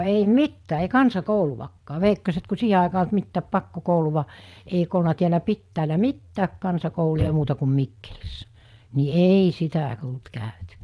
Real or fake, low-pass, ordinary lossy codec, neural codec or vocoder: fake; none; none; vocoder, 22.05 kHz, 80 mel bands, Vocos